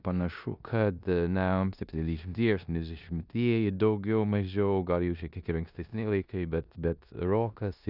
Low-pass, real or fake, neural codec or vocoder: 5.4 kHz; fake; codec, 16 kHz in and 24 kHz out, 0.9 kbps, LongCat-Audio-Codec, four codebook decoder